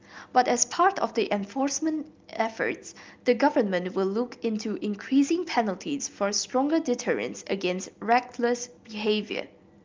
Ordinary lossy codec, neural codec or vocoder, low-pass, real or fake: Opus, 32 kbps; none; 7.2 kHz; real